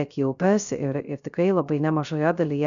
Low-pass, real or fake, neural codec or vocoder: 7.2 kHz; fake; codec, 16 kHz, 0.3 kbps, FocalCodec